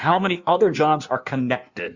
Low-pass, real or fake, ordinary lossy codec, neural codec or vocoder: 7.2 kHz; fake; Opus, 64 kbps; codec, 16 kHz in and 24 kHz out, 1.1 kbps, FireRedTTS-2 codec